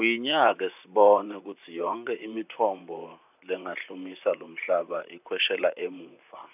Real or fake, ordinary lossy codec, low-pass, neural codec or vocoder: fake; none; 3.6 kHz; vocoder, 44.1 kHz, 128 mel bands, Pupu-Vocoder